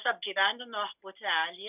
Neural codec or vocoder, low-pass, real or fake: none; 3.6 kHz; real